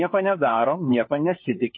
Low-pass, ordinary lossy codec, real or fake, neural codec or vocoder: 7.2 kHz; MP3, 24 kbps; fake; codec, 16 kHz, 4 kbps, FunCodec, trained on LibriTTS, 50 frames a second